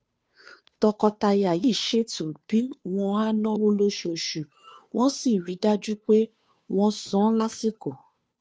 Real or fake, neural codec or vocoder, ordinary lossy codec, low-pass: fake; codec, 16 kHz, 2 kbps, FunCodec, trained on Chinese and English, 25 frames a second; none; none